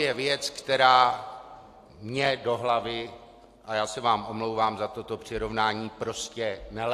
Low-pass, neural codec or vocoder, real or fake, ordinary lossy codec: 14.4 kHz; none; real; AAC, 64 kbps